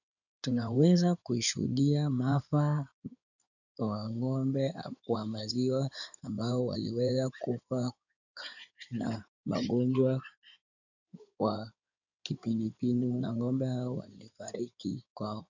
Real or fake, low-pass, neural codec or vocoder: fake; 7.2 kHz; codec, 16 kHz in and 24 kHz out, 2.2 kbps, FireRedTTS-2 codec